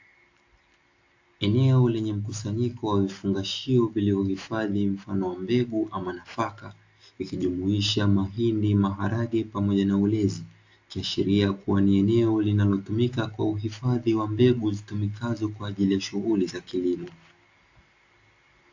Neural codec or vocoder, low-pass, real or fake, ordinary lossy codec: none; 7.2 kHz; real; AAC, 48 kbps